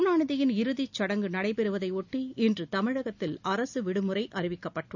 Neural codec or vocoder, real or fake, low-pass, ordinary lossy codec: none; real; 7.2 kHz; none